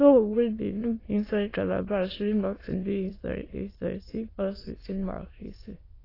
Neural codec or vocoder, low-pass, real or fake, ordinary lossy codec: autoencoder, 22.05 kHz, a latent of 192 numbers a frame, VITS, trained on many speakers; 5.4 kHz; fake; AAC, 24 kbps